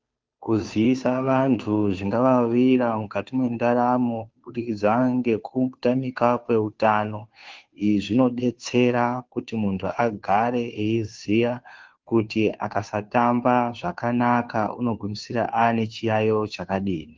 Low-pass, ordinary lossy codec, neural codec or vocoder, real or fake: 7.2 kHz; Opus, 32 kbps; codec, 16 kHz, 2 kbps, FunCodec, trained on Chinese and English, 25 frames a second; fake